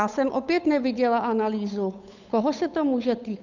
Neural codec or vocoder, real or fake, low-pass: codec, 16 kHz, 8 kbps, FunCodec, trained on Chinese and English, 25 frames a second; fake; 7.2 kHz